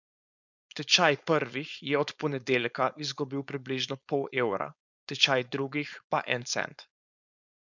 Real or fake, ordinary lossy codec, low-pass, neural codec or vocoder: fake; none; 7.2 kHz; codec, 16 kHz, 4.8 kbps, FACodec